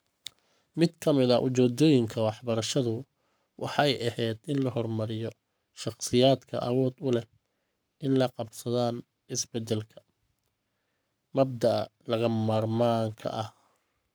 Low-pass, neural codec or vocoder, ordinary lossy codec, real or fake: none; codec, 44.1 kHz, 7.8 kbps, Pupu-Codec; none; fake